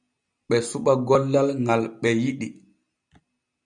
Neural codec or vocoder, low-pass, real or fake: none; 9.9 kHz; real